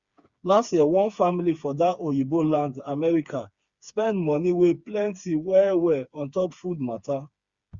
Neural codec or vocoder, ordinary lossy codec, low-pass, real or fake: codec, 16 kHz, 4 kbps, FreqCodec, smaller model; Opus, 64 kbps; 7.2 kHz; fake